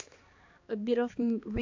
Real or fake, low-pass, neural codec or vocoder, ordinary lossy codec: fake; 7.2 kHz; codec, 16 kHz, 2 kbps, X-Codec, HuBERT features, trained on balanced general audio; AAC, 48 kbps